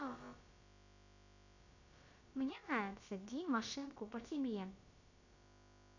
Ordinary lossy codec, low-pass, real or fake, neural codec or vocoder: none; 7.2 kHz; fake; codec, 16 kHz, about 1 kbps, DyCAST, with the encoder's durations